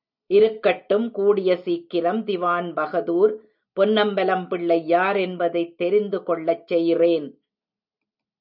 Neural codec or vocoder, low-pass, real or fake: none; 5.4 kHz; real